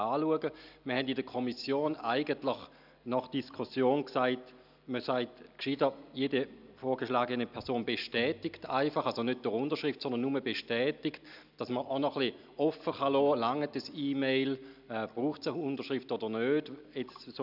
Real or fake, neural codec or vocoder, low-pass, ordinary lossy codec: real; none; 5.4 kHz; none